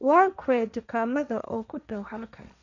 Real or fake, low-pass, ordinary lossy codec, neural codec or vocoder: fake; 7.2 kHz; none; codec, 16 kHz, 1.1 kbps, Voila-Tokenizer